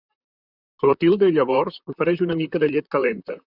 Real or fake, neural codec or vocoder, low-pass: fake; vocoder, 44.1 kHz, 128 mel bands, Pupu-Vocoder; 5.4 kHz